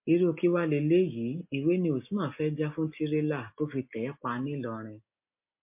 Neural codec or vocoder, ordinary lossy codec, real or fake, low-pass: none; MP3, 32 kbps; real; 3.6 kHz